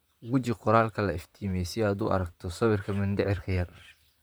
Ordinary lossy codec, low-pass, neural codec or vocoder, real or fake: none; none; vocoder, 44.1 kHz, 128 mel bands, Pupu-Vocoder; fake